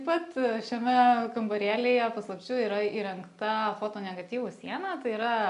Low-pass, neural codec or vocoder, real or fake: 10.8 kHz; none; real